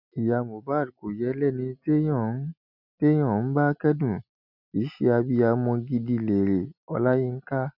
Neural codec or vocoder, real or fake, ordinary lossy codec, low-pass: none; real; none; 5.4 kHz